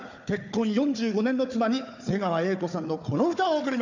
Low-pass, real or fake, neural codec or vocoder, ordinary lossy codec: 7.2 kHz; fake; codec, 16 kHz, 4 kbps, FunCodec, trained on Chinese and English, 50 frames a second; none